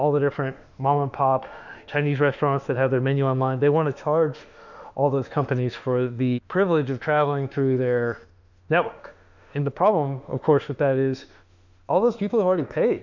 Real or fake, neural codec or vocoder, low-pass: fake; autoencoder, 48 kHz, 32 numbers a frame, DAC-VAE, trained on Japanese speech; 7.2 kHz